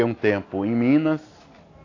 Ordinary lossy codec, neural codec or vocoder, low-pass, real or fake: AAC, 32 kbps; none; 7.2 kHz; real